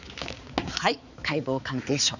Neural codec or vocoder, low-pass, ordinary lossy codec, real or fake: codec, 16 kHz, 4 kbps, X-Codec, HuBERT features, trained on balanced general audio; 7.2 kHz; none; fake